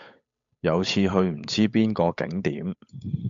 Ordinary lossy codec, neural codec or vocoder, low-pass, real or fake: MP3, 64 kbps; codec, 16 kHz, 8 kbps, FunCodec, trained on Chinese and English, 25 frames a second; 7.2 kHz; fake